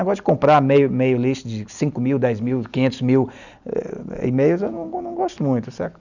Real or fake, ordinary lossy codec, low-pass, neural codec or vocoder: real; none; 7.2 kHz; none